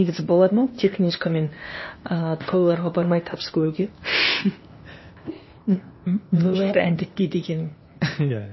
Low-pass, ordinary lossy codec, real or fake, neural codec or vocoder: 7.2 kHz; MP3, 24 kbps; fake; codec, 16 kHz, 0.8 kbps, ZipCodec